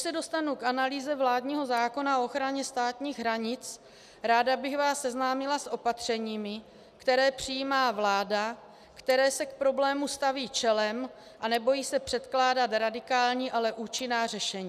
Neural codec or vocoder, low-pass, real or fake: none; 14.4 kHz; real